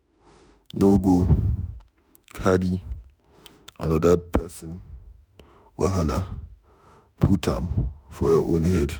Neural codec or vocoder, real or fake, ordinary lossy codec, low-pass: autoencoder, 48 kHz, 32 numbers a frame, DAC-VAE, trained on Japanese speech; fake; none; none